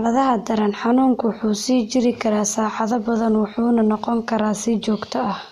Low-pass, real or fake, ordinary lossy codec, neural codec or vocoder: 14.4 kHz; real; MP3, 64 kbps; none